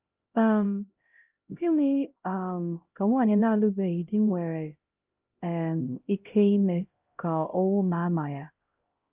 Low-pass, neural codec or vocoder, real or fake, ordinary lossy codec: 3.6 kHz; codec, 16 kHz, 0.5 kbps, X-Codec, HuBERT features, trained on LibriSpeech; fake; Opus, 24 kbps